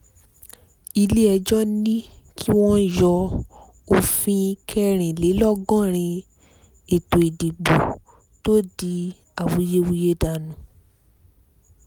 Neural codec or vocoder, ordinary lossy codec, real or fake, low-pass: none; none; real; none